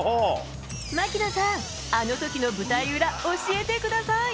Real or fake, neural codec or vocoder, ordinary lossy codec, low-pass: real; none; none; none